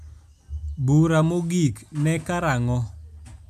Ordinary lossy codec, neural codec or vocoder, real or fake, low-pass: none; none; real; 14.4 kHz